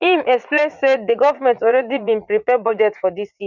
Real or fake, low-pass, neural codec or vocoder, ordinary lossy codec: fake; 7.2 kHz; vocoder, 44.1 kHz, 80 mel bands, Vocos; none